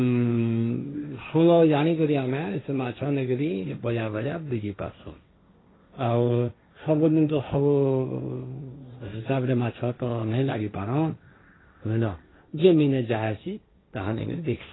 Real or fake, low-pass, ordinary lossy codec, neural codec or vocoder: fake; 7.2 kHz; AAC, 16 kbps; codec, 16 kHz, 1.1 kbps, Voila-Tokenizer